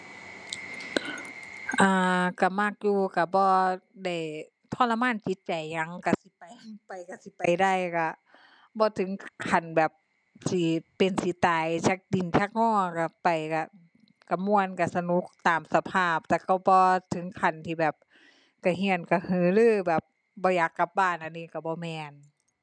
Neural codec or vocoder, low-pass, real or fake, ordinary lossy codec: none; 9.9 kHz; real; none